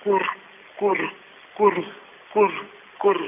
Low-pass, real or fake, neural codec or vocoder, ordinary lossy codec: 3.6 kHz; real; none; none